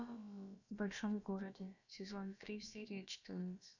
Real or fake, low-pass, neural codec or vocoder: fake; 7.2 kHz; codec, 16 kHz, about 1 kbps, DyCAST, with the encoder's durations